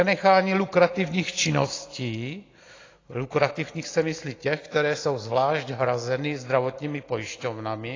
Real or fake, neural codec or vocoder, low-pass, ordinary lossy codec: real; none; 7.2 kHz; AAC, 32 kbps